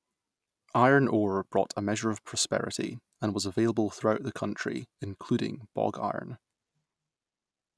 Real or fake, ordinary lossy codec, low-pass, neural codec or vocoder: real; none; none; none